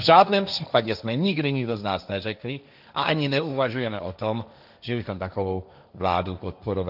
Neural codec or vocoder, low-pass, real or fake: codec, 16 kHz, 1.1 kbps, Voila-Tokenizer; 5.4 kHz; fake